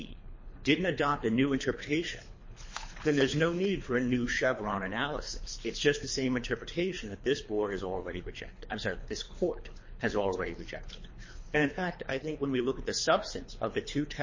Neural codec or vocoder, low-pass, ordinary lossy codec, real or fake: codec, 24 kHz, 3 kbps, HILCodec; 7.2 kHz; MP3, 32 kbps; fake